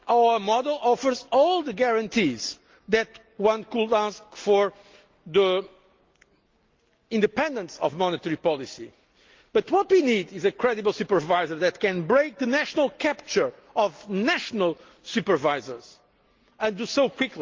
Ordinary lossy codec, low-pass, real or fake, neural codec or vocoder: Opus, 32 kbps; 7.2 kHz; real; none